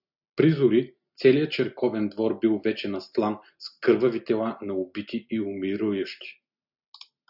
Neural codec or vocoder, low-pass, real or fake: none; 5.4 kHz; real